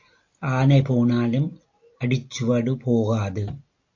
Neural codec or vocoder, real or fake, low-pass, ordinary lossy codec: none; real; 7.2 kHz; AAC, 48 kbps